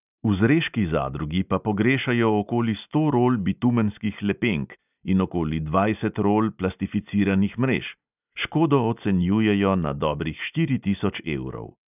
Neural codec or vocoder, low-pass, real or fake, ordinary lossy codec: none; 3.6 kHz; real; none